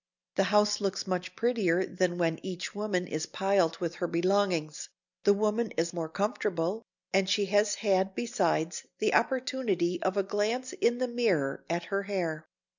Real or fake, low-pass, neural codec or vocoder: real; 7.2 kHz; none